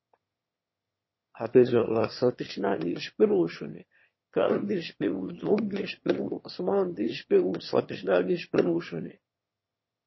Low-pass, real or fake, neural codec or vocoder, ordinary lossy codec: 7.2 kHz; fake; autoencoder, 22.05 kHz, a latent of 192 numbers a frame, VITS, trained on one speaker; MP3, 24 kbps